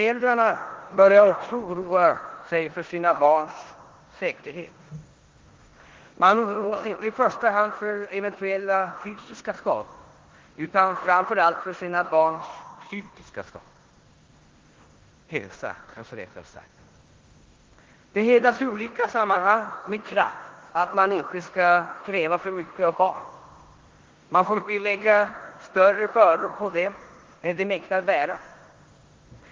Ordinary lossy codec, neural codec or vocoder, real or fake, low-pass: Opus, 16 kbps; codec, 16 kHz in and 24 kHz out, 0.9 kbps, LongCat-Audio-Codec, four codebook decoder; fake; 7.2 kHz